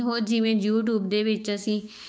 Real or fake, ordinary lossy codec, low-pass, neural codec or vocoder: fake; none; none; codec, 16 kHz, 6 kbps, DAC